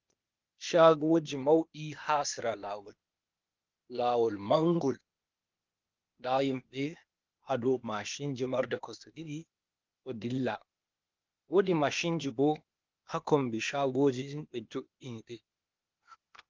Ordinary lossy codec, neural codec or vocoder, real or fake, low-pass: Opus, 24 kbps; codec, 16 kHz, 0.8 kbps, ZipCodec; fake; 7.2 kHz